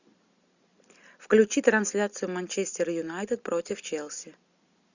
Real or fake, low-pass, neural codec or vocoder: real; 7.2 kHz; none